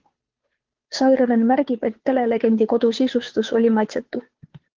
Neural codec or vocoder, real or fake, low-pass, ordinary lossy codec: codec, 16 kHz, 2 kbps, FunCodec, trained on Chinese and English, 25 frames a second; fake; 7.2 kHz; Opus, 16 kbps